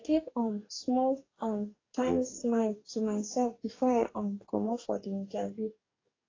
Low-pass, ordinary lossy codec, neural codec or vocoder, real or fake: 7.2 kHz; AAC, 32 kbps; codec, 44.1 kHz, 2.6 kbps, DAC; fake